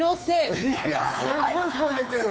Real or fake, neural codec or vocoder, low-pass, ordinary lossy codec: fake; codec, 16 kHz, 4 kbps, X-Codec, WavLM features, trained on Multilingual LibriSpeech; none; none